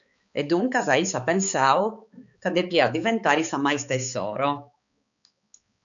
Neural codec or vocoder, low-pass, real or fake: codec, 16 kHz, 4 kbps, X-Codec, HuBERT features, trained on balanced general audio; 7.2 kHz; fake